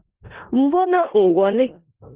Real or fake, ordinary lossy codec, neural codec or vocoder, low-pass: fake; Opus, 16 kbps; codec, 16 kHz in and 24 kHz out, 0.4 kbps, LongCat-Audio-Codec, four codebook decoder; 3.6 kHz